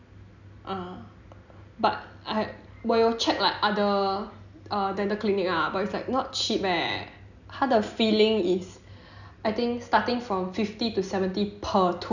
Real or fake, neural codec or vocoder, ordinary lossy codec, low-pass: real; none; none; 7.2 kHz